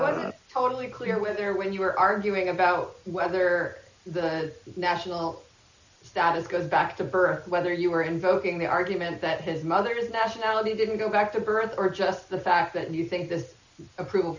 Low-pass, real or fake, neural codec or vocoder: 7.2 kHz; real; none